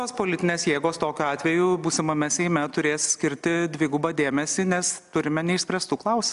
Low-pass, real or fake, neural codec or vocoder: 10.8 kHz; real; none